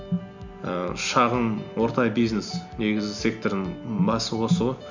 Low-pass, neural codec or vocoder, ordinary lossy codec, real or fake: 7.2 kHz; none; none; real